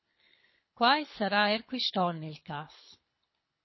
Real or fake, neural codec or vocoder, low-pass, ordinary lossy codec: fake; codec, 24 kHz, 6 kbps, HILCodec; 7.2 kHz; MP3, 24 kbps